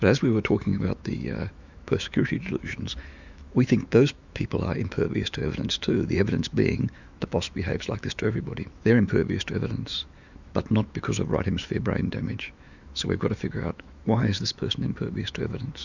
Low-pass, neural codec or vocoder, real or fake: 7.2 kHz; autoencoder, 48 kHz, 128 numbers a frame, DAC-VAE, trained on Japanese speech; fake